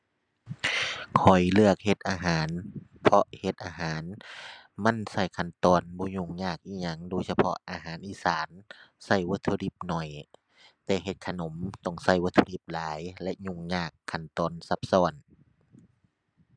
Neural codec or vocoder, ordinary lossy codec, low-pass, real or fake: none; none; 9.9 kHz; real